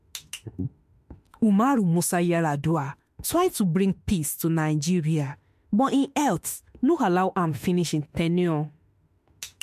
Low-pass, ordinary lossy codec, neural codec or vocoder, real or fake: 14.4 kHz; MP3, 64 kbps; autoencoder, 48 kHz, 32 numbers a frame, DAC-VAE, trained on Japanese speech; fake